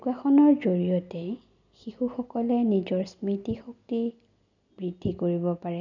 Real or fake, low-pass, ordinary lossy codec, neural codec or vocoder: real; 7.2 kHz; none; none